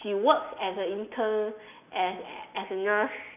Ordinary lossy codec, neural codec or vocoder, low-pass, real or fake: none; none; 3.6 kHz; real